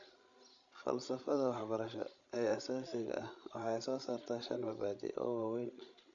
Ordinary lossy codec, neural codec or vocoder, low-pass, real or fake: none; codec, 16 kHz, 16 kbps, FreqCodec, larger model; 7.2 kHz; fake